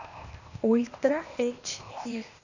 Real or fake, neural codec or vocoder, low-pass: fake; codec, 16 kHz, 0.8 kbps, ZipCodec; 7.2 kHz